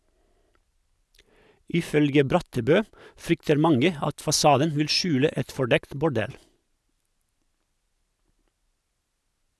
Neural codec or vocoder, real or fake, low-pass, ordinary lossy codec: none; real; none; none